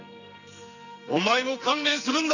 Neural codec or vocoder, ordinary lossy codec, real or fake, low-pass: codec, 44.1 kHz, 2.6 kbps, SNAC; none; fake; 7.2 kHz